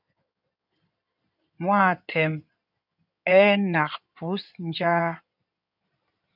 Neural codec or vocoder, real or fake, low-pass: codec, 16 kHz in and 24 kHz out, 2.2 kbps, FireRedTTS-2 codec; fake; 5.4 kHz